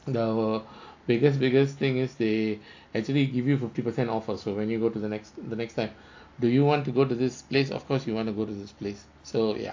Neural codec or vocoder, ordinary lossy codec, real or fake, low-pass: none; AAC, 48 kbps; real; 7.2 kHz